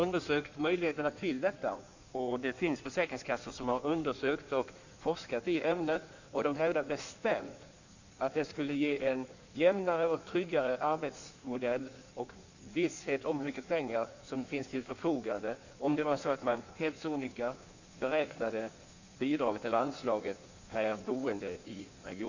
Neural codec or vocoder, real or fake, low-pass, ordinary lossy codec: codec, 16 kHz in and 24 kHz out, 1.1 kbps, FireRedTTS-2 codec; fake; 7.2 kHz; none